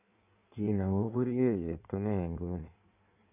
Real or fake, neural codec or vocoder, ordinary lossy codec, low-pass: fake; codec, 16 kHz in and 24 kHz out, 1.1 kbps, FireRedTTS-2 codec; none; 3.6 kHz